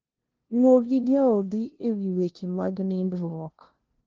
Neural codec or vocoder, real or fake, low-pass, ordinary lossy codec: codec, 16 kHz, 0.5 kbps, FunCodec, trained on LibriTTS, 25 frames a second; fake; 7.2 kHz; Opus, 16 kbps